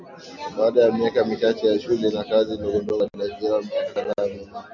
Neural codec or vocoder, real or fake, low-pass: none; real; 7.2 kHz